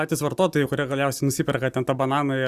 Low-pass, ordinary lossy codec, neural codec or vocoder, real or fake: 14.4 kHz; Opus, 64 kbps; none; real